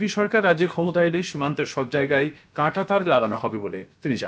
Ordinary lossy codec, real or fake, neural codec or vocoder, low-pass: none; fake; codec, 16 kHz, about 1 kbps, DyCAST, with the encoder's durations; none